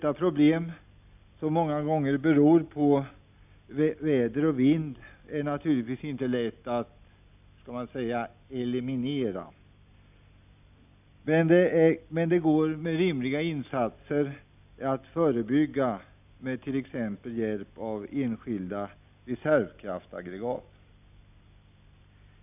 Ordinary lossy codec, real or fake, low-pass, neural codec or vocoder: none; real; 3.6 kHz; none